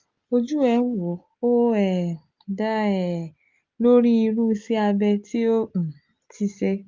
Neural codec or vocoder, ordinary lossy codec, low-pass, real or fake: none; Opus, 32 kbps; 7.2 kHz; real